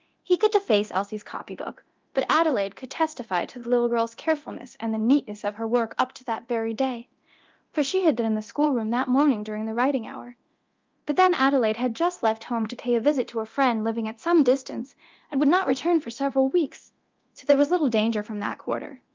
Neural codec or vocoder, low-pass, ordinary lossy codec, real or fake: codec, 24 kHz, 0.9 kbps, DualCodec; 7.2 kHz; Opus, 24 kbps; fake